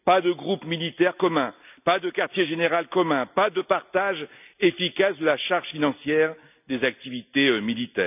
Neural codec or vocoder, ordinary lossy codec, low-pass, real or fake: none; none; 3.6 kHz; real